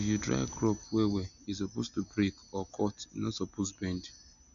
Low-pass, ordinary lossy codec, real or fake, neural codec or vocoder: 7.2 kHz; none; real; none